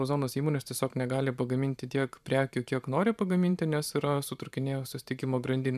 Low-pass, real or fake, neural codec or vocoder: 14.4 kHz; fake; vocoder, 44.1 kHz, 128 mel bands every 512 samples, BigVGAN v2